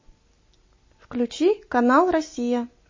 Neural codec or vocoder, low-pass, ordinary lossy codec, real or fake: none; 7.2 kHz; MP3, 32 kbps; real